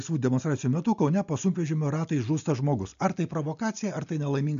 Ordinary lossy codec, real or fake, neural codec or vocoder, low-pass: AAC, 96 kbps; real; none; 7.2 kHz